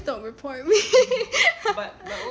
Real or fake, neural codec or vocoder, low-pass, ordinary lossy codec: real; none; none; none